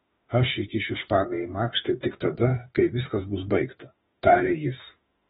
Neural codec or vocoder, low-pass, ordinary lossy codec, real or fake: autoencoder, 48 kHz, 32 numbers a frame, DAC-VAE, trained on Japanese speech; 19.8 kHz; AAC, 16 kbps; fake